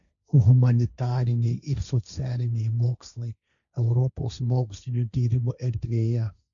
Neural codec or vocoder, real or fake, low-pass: codec, 16 kHz, 1.1 kbps, Voila-Tokenizer; fake; 7.2 kHz